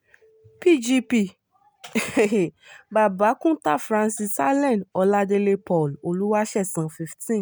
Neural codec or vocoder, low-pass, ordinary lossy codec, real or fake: none; none; none; real